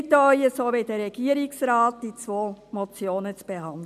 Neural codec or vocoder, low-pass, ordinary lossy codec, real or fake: none; 14.4 kHz; none; real